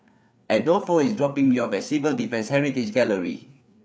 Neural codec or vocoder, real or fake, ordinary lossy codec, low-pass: codec, 16 kHz, 4 kbps, FreqCodec, larger model; fake; none; none